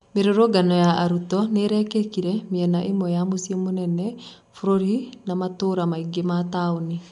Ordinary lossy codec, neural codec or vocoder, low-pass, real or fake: MP3, 64 kbps; none; 10.8 kHz; real